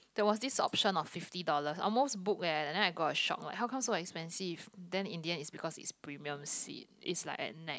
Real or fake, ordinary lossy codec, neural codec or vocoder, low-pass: real; none; none; none